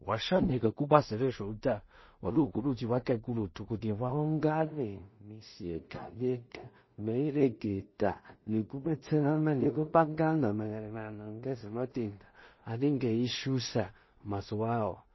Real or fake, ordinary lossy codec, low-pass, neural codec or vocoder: fake; MP3, 24 kbps; 7.2 kHz; codec, 16 kHz in and 24 kHz out, 0.4 kbps, LongCat-Audio-Codec, two codebook decoder